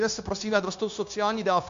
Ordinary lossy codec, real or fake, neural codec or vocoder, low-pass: MP3, 64 kbps; fake; codec, 16 kHz, 0.9 kbps, LongCat-Audio-Codec; 7.2 kHz